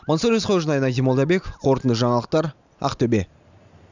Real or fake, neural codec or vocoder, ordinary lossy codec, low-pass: real; none; none; 7.2 kHz